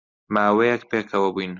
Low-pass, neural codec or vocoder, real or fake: 7.2 kHz; none; real